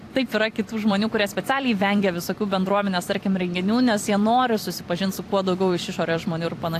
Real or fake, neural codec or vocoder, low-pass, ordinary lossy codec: fake; vocoder, 44.1 kHz, 128 mel bands every 256 samples, BigVGAN v2; 14.4 kHz; AAC, 64 kbps